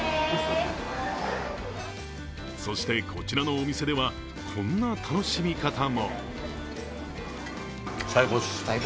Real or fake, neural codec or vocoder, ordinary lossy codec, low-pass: real; none; none; none